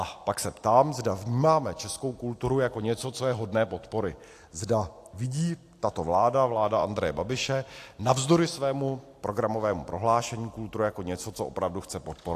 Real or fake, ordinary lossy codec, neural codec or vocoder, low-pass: real; AAC, 64 kbps; none; 14.4 kHz